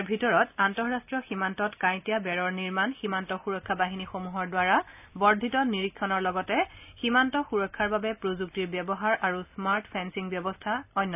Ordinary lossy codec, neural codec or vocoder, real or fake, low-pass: none; none; real; 3.6 kHz